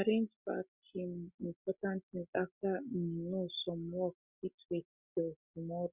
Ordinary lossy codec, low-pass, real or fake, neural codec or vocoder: Opus, 64 kbps; 3.6 kHz; real; none